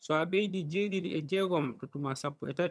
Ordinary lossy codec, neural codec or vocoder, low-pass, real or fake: none; vocoder, 22.05 kHz, 80 mel bands, HiFi-GAN; none; fake